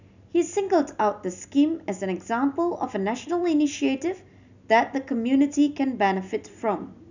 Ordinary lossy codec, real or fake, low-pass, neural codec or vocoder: none; real; 7.2 kHz; none